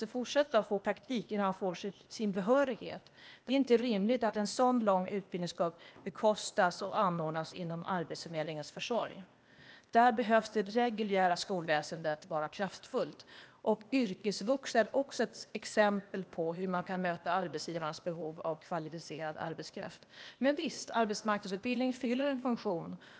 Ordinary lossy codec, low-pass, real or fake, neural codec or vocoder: none; none; fake; codec, 16 kHz, 0.8 kbps, ZipCodec